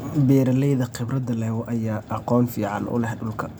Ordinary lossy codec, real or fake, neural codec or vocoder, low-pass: none; real; none; none